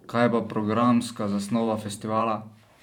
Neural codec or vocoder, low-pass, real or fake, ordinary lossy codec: vocoder, 44.1 kHz, 128 mel bands every 512 samples, BigVGAN v2; 19.8 kHz; fake; none